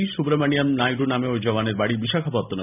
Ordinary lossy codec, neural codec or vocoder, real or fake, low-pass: none; none; real; 3.6 kHz